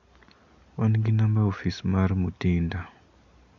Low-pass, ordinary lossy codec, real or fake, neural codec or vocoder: 7.2 kHz; none; real; none